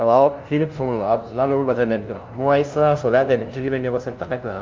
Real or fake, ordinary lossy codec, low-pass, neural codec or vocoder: fake; Opus, 24 kbps; 7.2 kHz; codec, 16 kHz, 0.5 kbps, FunCodec, trained on LibriTTS, 25 frames a second